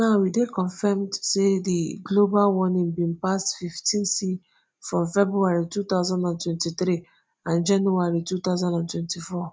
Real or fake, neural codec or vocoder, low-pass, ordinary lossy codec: real; none; none; none